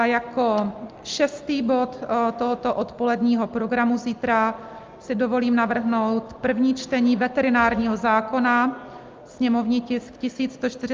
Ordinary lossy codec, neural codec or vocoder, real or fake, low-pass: Opus, 32 kbps; none; real; 7.2 kHz